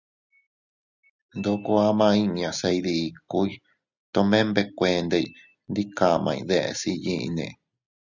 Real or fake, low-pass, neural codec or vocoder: real; 7.2 kHz; none